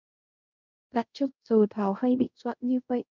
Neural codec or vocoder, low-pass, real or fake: codec, 24 kHz, 0.5 kbps, DualCodec; 7.2 kHz; fake